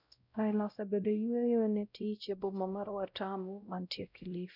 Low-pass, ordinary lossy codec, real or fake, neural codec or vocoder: 5.4 kHz; none; fake; codec, 16 kHz, 0.5 kbps, X-Codec, WavLM features, trained on Multilingual LibriSpeech